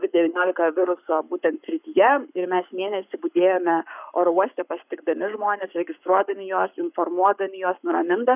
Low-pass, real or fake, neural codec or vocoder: 3.6 kHz; fake; codec, 44.1 kHz, 7.8 kbps, Pupu-Codec